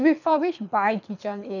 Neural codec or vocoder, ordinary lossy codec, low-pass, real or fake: codec, 24 kHz, 6 kbps, HILCodec; none; 7.2 kHz; fake